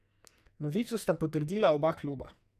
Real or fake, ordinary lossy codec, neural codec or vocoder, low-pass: fake; none; codec, 44.1 kHz, 2.6 kbps, SNAC; 14.4 kHz